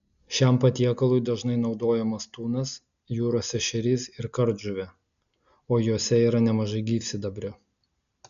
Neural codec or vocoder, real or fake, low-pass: none; real; 7.2 kHz